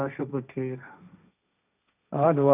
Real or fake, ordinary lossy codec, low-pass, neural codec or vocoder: fake; none; 3.6 kHz; codec, 16 kHz, 1.1 kbps, Voila-Tokenizer